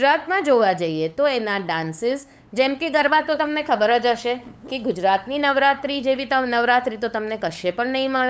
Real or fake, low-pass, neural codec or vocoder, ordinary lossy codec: fake; none; codec, 16 kHz, 8 kbps, FunCodec, trained on LibriTTS, 25 frames a second; none